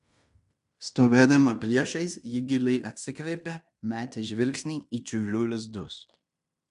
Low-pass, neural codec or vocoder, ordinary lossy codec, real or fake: 10.8 kHz; codec, 16 kHz in and 24 kHz out, 0.9 kbps, LongCat-Audio-Codec, fine tuned four codebook decoder; AAC, 96 kbps; fake